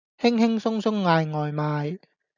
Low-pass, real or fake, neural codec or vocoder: 7.2 kHz; real; none